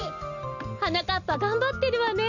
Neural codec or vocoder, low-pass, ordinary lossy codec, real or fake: none; 7.2 kHz; none; real